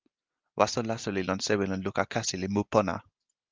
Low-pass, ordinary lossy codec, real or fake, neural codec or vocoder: 7.2 kHz; Opus, 32 kbps; real; none